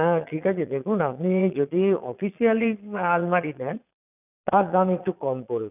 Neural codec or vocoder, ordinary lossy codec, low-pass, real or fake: vocoder, 22.05 kHz, 80 mel bands, Vocos; none; 3.6 kHz; fake